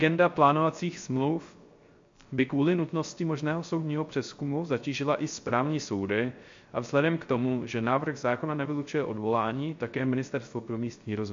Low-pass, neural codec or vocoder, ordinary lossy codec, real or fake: 7.2 kHz; codec, 16 kHz, 0.3 kbps, FocalCodec; MP3, 48 kbps; fake